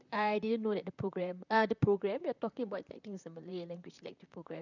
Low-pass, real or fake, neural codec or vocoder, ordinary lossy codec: 7.2 kHz; fake; vocoder, 44.1 kHz, 128 mel bands, Pupu-Vocoder; none